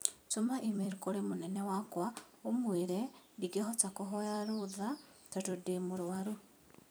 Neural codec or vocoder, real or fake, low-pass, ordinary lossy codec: vocoder, 44.1 kHz, 128 mel bands every 256 samples, BigVGAN v2; fake; none; none